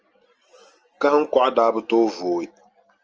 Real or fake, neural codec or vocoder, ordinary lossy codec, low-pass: real; none; Opus, 24 kbps; 7.2 kHz